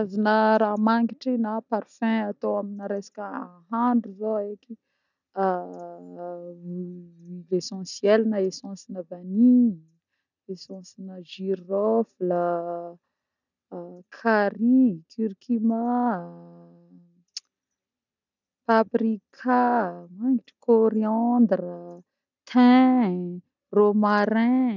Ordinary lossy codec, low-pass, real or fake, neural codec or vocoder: none; 7.2 kHz; real; none